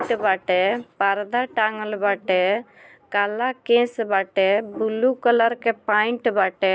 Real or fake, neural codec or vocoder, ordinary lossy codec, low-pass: real; none; none; none